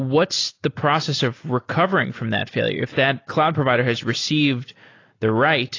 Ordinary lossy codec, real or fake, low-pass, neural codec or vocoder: AAC, 32 kbps; real; 7.2 kHz; none